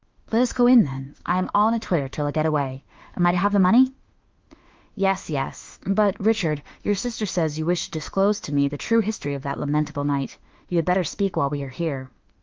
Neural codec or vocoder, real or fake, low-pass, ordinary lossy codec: autoencoder, 48 kHz, 32 numbers a frame, DAC-VAE, trained on Japanese speech; fake; 7.2 kHz; Opus, 32 kbps